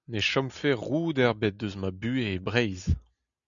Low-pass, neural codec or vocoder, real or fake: 7.2 kHz; none; real